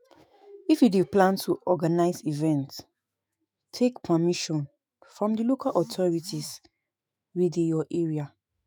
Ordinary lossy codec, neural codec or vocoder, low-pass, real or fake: none; autoencoder, 48 kHz, 128 numbers a frame, DAC-VAE, trained on Japanese speech; none; fake